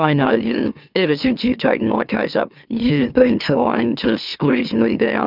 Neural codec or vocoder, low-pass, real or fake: autoencoder, 44.1 kHz, a latent of 192 numbers a frame, MeloTTS; 5.4 kHz; fake